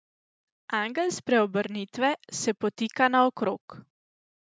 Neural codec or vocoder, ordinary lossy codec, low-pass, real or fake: none; none; none; real